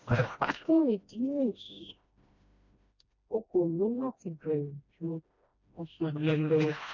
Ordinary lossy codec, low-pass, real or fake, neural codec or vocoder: none; 7.2 kHz; fake; codec, 16 kHz, 1 kbps, FreqCodec, smaller model